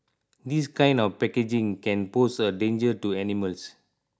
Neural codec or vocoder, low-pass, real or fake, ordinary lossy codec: none; none; real; none